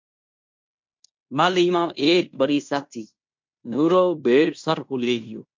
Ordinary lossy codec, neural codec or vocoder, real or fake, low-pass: MP3, 48 kbps; codec, 16 kHz in and 24 kHz out, 0.9 kbps, LongCat-Audio-Codec, fine tuned four codebook decoder; fake; 7.2 kHz